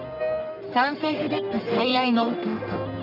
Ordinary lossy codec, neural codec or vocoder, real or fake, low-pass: none; codec, 44.1 kHz, 1.7 kbps, Pupu-Codec; fake; 5.4 kHz